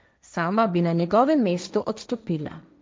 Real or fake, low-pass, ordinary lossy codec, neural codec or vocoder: fake; none; none; codec, 16 kHz, 1.1 kbps, Voila-Tokenizer